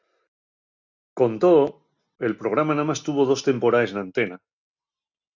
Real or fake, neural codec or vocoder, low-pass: real; none; 7.2 kHz